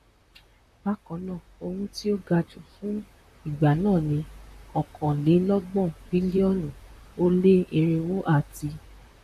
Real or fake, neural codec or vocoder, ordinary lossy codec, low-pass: fake; vocoder, 44.1 kHz, 128 mel bands, Pupu-Vocoder; AAC, 96 kbps; 14.4 kHz